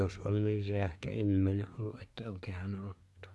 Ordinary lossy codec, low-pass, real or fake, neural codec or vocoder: none; none; fake; codec, 24 kHz, 1 kbps, SNAC